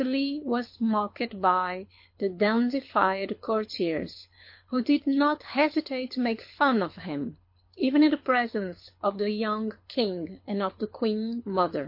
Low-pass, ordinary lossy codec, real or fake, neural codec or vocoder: 5.4 kHz; MP3, 32 kbps; fake; codec, 24 kHz, 6 kbps, HILCodec